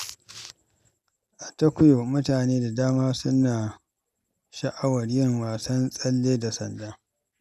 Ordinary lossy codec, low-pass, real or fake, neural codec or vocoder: none; 14.4 kHz; real; none